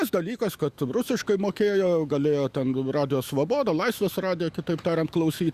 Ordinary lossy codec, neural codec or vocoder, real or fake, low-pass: Opus, 64 kbps; autoencoder, 48 kHz, 128 numbers a frame, DAC-VAE, trained on Japanese speech; fake; 14.4 kHz